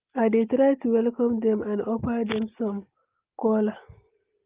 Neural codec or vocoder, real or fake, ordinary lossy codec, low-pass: none; real; Opus, 32 kbps; 3.6 kHz